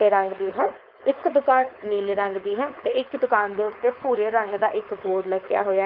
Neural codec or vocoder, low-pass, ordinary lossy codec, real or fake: codec, 16 kHz, 4.8 kbps, FACodec; 5.4 kHz; Opus, 32 kbps; fake